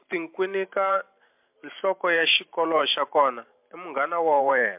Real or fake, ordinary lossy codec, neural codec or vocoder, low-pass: fake; MP3, 32 kbps; vocoder, 44.1 kHz, 128 mel bands every 512 samples, BigVGAN v2; 3.6 kHz